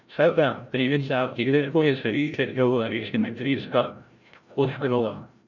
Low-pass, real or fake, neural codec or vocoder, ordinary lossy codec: 7.2 kHz; fake; codec, 16 kHz, 0.5 kbps, FreqCodec, larger model; none